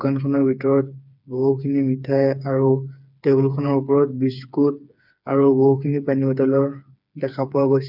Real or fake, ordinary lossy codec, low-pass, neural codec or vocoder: fake; none; 5.4 kHz; codec, 16 kHz, 4 kbps, FreqCodec, smaller model